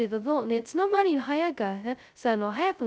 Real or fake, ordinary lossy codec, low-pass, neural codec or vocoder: fake; none; none; codec, 16 kHz, 0.2 kbps, FocalCodec